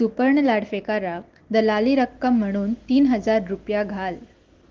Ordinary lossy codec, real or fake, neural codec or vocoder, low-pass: Opus, 16 kbps; real; none; 7.2 kHz